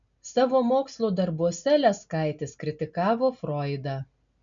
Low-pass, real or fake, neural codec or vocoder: 7.2 kHz; real; none